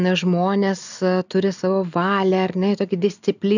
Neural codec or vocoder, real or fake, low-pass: none; real; 7.2 kHz